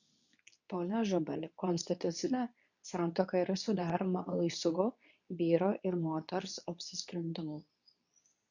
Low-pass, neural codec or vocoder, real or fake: 7.2 kHz; codec, 24 kHz, 0.9 kbps, WavTokenizer, medium speech release version 2; fake